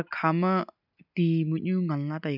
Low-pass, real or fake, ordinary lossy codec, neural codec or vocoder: 5.4 kHz; real; none; none